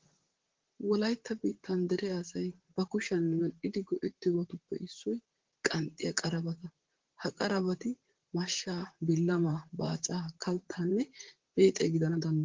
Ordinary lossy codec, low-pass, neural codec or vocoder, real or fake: Opus, 16 kbps; 7.2 kHz; vocoder, 44.1 kHz, 128 mel bands, Pupu-Vocoder; fake